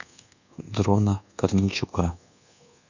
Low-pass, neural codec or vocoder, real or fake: 7.2 kHz; codec, 24 kHz, 1.2 kbps, DualCodec; fake